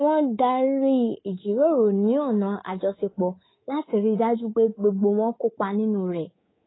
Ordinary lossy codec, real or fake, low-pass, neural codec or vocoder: AAC, 16 kbps; fake; 7.2 kHz; codec, 24 kHz, 3.1 kbps, DualCodec